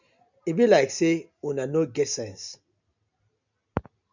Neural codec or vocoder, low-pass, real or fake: none; 7.2 kHz; real